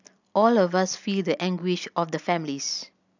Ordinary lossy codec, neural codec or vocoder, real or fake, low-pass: none; none; real; 7.2 kHz